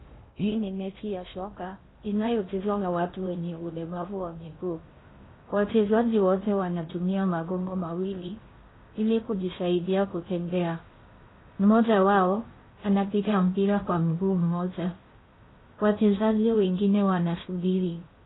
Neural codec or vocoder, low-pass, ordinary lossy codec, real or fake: codec, 16 kHz in and 24 kHz out, 0.6 kbps, FocalCodec, streaming, 2048 codes; 7.2 kHz; AAC, 16 kbps; fake